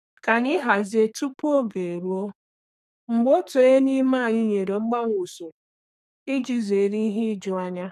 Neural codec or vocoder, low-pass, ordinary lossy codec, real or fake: codec, 32 kHz, 1.9 kbps, SNAC; 14.4 kHz; none; fake